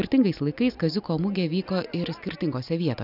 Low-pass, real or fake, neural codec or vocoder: 5.4 kHz; real; none